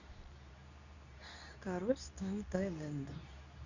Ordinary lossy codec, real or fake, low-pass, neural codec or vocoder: none; fake; 7.2 kHz; codec, 24 kHz, 0.9 kbps, WavTokenizer, medium speech release version 2